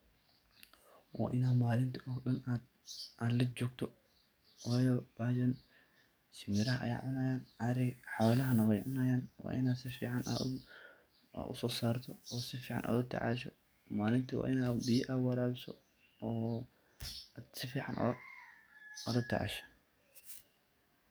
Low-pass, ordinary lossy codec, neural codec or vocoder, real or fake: none; none; codec, 44.1 kHz, 7.8 kbps, DAC; fake